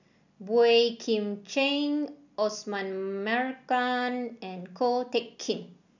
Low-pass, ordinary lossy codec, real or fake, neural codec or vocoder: 7.2 kHz; none; real; none